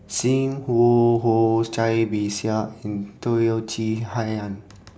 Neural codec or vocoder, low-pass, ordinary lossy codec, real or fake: none; none; none; real